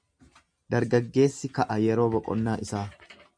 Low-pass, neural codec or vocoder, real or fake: 9.9 kHz; none; real